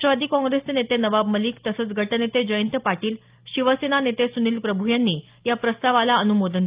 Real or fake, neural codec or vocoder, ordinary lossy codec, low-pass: real; none; Opus, 32 kbps; 3.6 kHz